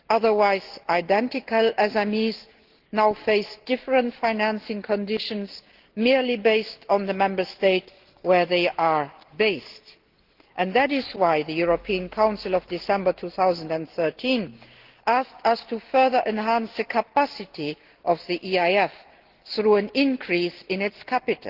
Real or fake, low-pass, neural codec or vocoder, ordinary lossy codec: real; 5.4 kHz; none; Opus, 16 kbps